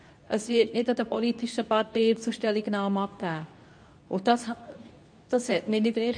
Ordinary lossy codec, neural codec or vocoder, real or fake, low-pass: AAC, 64 kbps; codec, 24 kHz, 0.9 kbps, WavTokenizer, medium speech release version 2; fake; 9.9 kHz